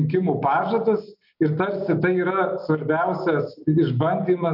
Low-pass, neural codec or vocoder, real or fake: 5.4 kHz; none; real